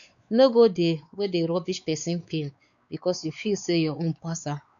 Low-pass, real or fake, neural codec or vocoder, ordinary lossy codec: 7.2 kHz; fake; codec, 16 kHz, 4 kbps, X-Codec, WavLM features, trained on Multilingual LibriSpeech; none